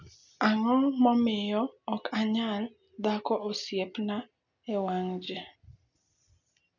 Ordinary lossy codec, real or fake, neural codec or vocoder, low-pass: none; real; none; 7.2 kHz